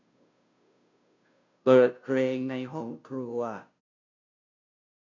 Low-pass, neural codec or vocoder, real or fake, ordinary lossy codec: 7.2 kHz; codec, 16 kHz, 0.5 kbps, FunCodec, trained on Chinese and English, 25 frames a second; fake; none